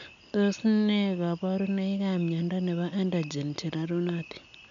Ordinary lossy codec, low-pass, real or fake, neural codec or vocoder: none; 7.2 kHz; real; none